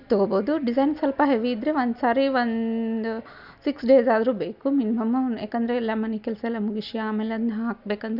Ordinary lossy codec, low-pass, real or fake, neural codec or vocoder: none; 5.4 kHz; real; none